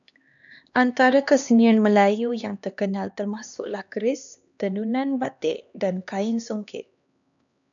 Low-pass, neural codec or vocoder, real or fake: 7.2 kHz; codec, 16 kHz, 2 kbps, X-Codec, HuBERT features, trained on LibriSpeech; fake